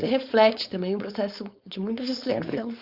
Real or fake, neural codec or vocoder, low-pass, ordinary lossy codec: fake; codec, 16 kHz, 4.8 kbps, FACodec; 5.4 kHz; Opus, 64 kbps